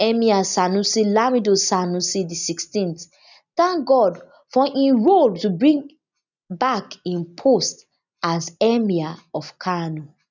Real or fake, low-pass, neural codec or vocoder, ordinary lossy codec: real; 7.2 kHz; none; none